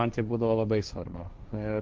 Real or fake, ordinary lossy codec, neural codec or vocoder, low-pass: fake; Opus, 32 kbps; codec, 16 kHz, 1.1 kbps, Voila-Tokenizer; 7.2 kHz